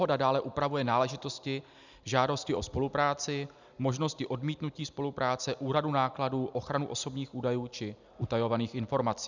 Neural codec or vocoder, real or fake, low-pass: none; real; 7.2 kHz